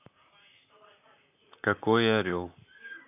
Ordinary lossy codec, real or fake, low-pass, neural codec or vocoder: none; fake; 3.6 kHz; vocoder, 44.1 kHz, 128 mel bands, Pupu-Vocoder